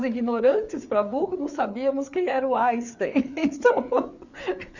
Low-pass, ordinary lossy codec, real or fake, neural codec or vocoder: 7.2 kHz; none; fake; codec, 16 kHz, 4 kbps, FreqCodec, larger model